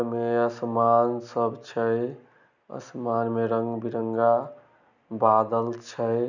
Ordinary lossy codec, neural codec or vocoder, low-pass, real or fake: none; none; 7.2 kHz; real